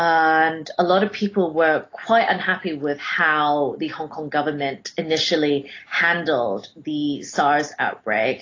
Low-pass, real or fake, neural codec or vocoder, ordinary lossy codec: 7.2 kHz; real; none; AAC, 32 kbps